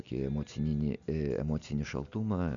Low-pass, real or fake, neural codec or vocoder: 7.2 kHz; real; none